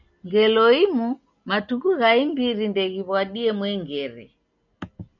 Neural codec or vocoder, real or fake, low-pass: none; real; 7.2 kHz